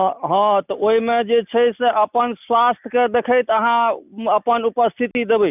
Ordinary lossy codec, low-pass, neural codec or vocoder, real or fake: none; 3.6 kHz; none; real